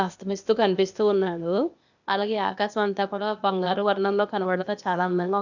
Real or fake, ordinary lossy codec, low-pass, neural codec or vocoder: fake; none; 7.2 kHz; codec, 16 kHz, 0.8 kbps, ZipCodec